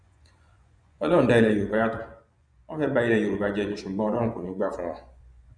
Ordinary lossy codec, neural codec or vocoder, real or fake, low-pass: none; vocoder, 24 kHz, 100 mel bands, Vocos; fake; 9.9 kHz